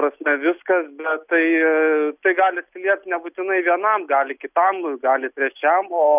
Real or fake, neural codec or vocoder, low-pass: real; none; 3.6 kHz